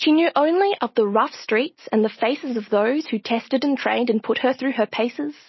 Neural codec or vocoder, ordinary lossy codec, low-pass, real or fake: none; MP3, 24 kbps; 7.2 kHz; real